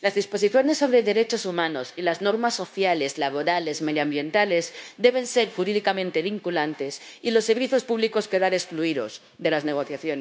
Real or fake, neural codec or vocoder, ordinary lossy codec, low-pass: fake; codec, 16 kHz, 0.9 kbps, LongCat-Audio-Codec; none; none